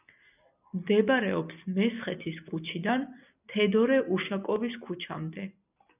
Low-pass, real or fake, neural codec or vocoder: 3.6 kHz; real; none